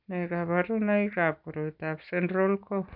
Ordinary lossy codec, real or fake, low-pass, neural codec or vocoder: none; real; 5.4 kHz; none